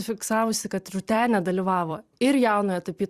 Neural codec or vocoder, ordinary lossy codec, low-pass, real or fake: vocoder, 44.1 kHz, 128 mel bands every 256 samples, BigVGAN v2; Opus, 64 kbps; 14.4 kHz; fake